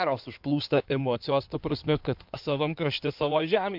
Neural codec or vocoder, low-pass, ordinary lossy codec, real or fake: codec, 16 kHz in and 24 kHz out, 2.2 kbps, FireRedTTS-2 codec; 5.4 kHz; MP3, 48 kbps; fake